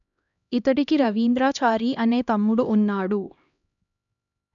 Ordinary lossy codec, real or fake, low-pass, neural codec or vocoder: none; fake; 7.2 kHz; codec, 16 kHz, 1 kbps, X-Codec, HuBERT features, trained on LibriSpeech